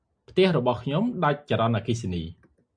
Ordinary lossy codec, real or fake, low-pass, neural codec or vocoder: MP3, 96 kbps; real; 9.9 kHz; none